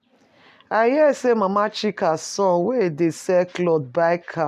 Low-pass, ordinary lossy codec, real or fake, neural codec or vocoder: 14.4 kHz; none; real; none